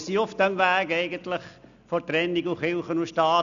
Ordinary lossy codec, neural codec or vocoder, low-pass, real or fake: none; none; 7.2 kHz; real